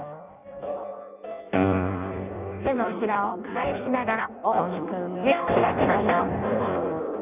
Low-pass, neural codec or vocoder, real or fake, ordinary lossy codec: 3.6 kHz; codec, 16 kHz in and 24 kHz out, 0.6 kbps, FireRedTTS-2 codec; fake; none